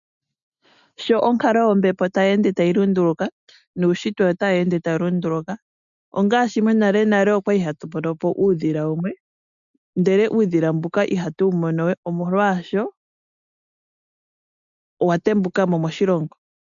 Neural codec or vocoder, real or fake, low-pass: none; real; 7.2 kHz